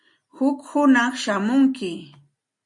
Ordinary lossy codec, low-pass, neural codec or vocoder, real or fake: MP3, 48 kbps; 10.8 kHz; none; real